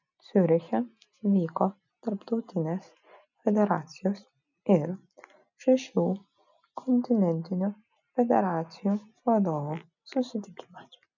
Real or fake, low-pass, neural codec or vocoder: real; 7.2 kHz; none